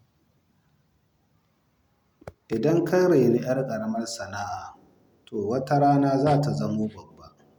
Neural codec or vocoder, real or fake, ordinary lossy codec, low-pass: none; real; none; 19.8 kHz